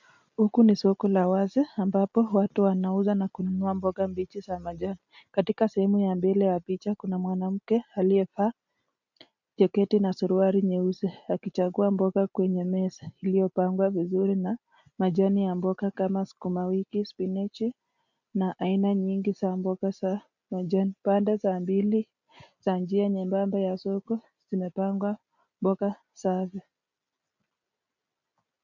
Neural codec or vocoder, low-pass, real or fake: none; 7.2 kHz; real